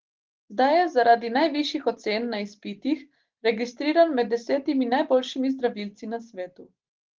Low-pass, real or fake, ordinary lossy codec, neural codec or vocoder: 7.2 kHz; real; Opus, 16 kbps; none